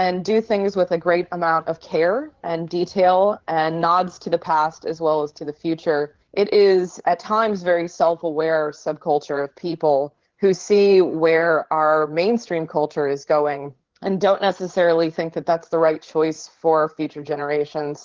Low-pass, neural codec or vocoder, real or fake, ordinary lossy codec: 7.2 kHz; codec, 16 kHz, 8 kbps, FreqCodec, larger model; fake; Opus, 16 kbps